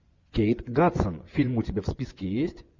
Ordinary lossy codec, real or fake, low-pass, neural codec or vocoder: Opus, 64 kbps; fake; 7.2 kHz; vocoder, 24 kHz, 100 mel bands, Vocos